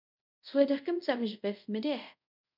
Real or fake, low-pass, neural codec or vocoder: fake; 5.4 kHz; codec, 24 kHz, 0.5 kbps, DualCodec